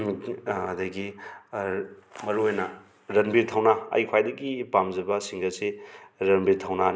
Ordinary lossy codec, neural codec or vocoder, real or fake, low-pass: none; none; real; none